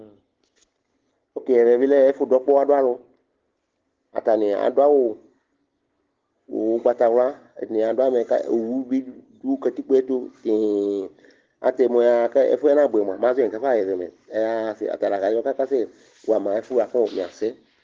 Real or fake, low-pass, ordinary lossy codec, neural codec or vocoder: real; 7.2 kHz; Opus, 16 kbps; none